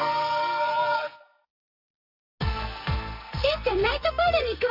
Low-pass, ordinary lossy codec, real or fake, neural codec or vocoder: 5.4 kHz; none; fake; codec, 44.1 kHz, 2.6 kbps, SNAC